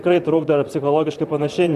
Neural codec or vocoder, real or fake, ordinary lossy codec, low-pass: vocoder, 44.1 kHz, 128 mel bands, Pupu-Vocoder; fake; MP3, 96 kbps; 14.4 kHz